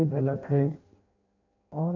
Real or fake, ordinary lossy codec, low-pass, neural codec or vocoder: fake; none; 7.2 kHz; codec, 16 kHz in and 24 kHz out, 0.6 kbps, FireRedTTS-2 codec